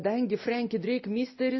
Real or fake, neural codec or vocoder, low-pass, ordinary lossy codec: real; none; 7.2 kHz; MP3, 24 kbps